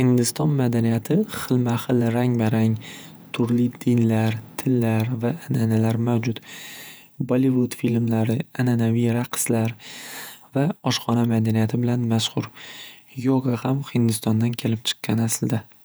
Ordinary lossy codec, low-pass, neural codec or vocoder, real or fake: none; none; vocoder, 48 kHz, 128 mel bands, Vocos; fake